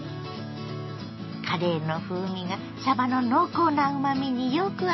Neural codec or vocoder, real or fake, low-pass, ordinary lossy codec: none; real; 7.2 kHz; MP3, 24 kbps